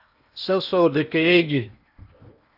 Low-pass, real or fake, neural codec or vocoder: 5.4 kHz; fake; codec, 16 kHz in and 24 kHz out, 0.8 kbps, FocalCodec, streaming, 65536 codes